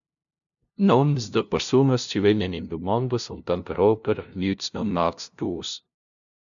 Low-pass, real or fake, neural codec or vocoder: 7.2 kHz; fake; codec, 16 kHz, 0.5 kbps, FunCodec, trained on LibriTTS, 25 frames a second